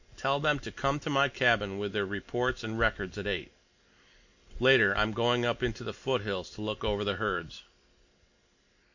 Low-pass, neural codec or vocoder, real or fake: 7.2 kHz; none; real